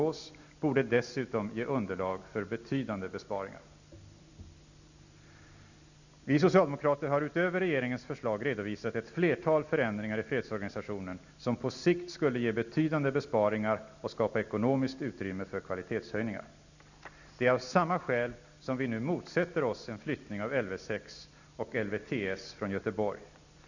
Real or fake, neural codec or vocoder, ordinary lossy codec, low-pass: real; none; none; 7.2 kHz